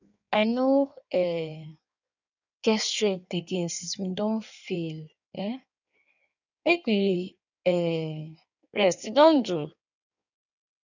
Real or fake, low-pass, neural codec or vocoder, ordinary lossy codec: fake; 7.2 kHz; codec, 16 kHz in and 24 kHz out, 1.1 kbps, FireRedTTS-2 codec; none